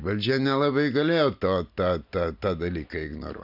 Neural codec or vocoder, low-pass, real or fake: none; 5.4 kHz; real